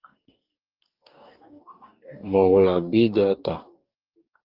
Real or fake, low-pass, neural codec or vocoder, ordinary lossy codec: fake; 5.4 kHz; codec, 44.1 kHz, 2.6 kbps, DAC; Opus, 32 kbps